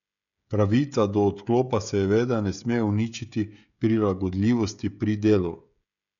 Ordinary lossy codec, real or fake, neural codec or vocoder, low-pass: none; fake; codec, 16 kHz, 16 kbps, FreqCodec, smaller model; 7.2 kHz